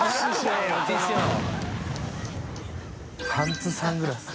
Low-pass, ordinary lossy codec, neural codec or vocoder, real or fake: none; none; none; real